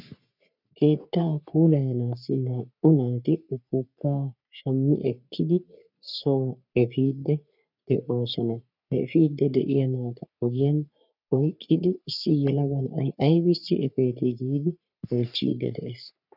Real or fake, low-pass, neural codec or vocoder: fake; 5.4 kHz; codec, 44.1 kHz, 3.4 kbps, Pupu-Codec